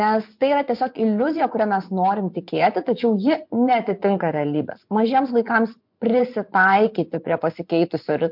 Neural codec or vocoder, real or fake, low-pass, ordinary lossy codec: vocoder, 24 kHz, 100 mel bands, Vocos; fake; 5.4 kHz; MP3, 48 kbps